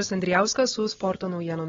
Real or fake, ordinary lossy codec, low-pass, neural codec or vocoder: real; AAC, 24 kbps; 7.2 kHz; none